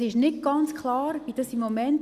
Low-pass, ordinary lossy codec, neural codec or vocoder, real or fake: 14.4 kHz; Opus, 64 kbps; none; real